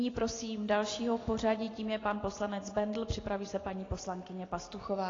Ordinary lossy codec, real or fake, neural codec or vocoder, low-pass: AAC, 32 kbps; real; none; 7.2 kHz